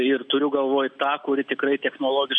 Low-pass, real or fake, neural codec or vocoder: 9.9 kHz; real; none